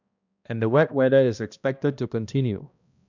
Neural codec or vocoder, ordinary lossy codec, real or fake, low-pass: codec, 16 kHz, 1 kbps, X-Codec, HuBERT features, trained on balanced general audio; none; fake; 7.2 kHz